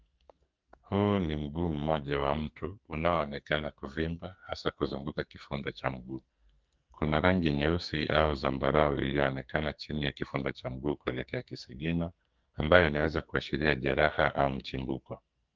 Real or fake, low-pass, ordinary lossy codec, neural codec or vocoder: fake; 7.2 kHz; Opus, 24 kbps; codec, 44.1 kHz, 2.6 kbps, SNAC